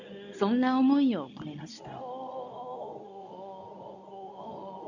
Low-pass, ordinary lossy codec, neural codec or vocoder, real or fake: 7.2 kHz; none; codec, 24 kHz, 0.9 kbps, WavTokenizer, medium speech release version 2; fake